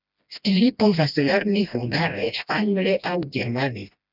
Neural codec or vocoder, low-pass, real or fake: codec, 16 kHz, 1 kbps, FreqCodec, smaller model; 5.4 kHz; fake